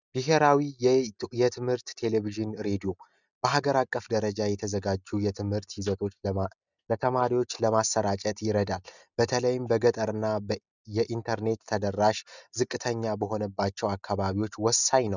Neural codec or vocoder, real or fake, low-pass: none; real; 7.2 kHz